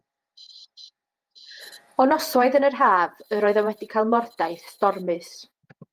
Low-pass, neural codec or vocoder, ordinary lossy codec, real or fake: 14.4 kHz; vocoder, 48 kHz, 128 mel bands, Vocos; Opus, 32 kbps; fake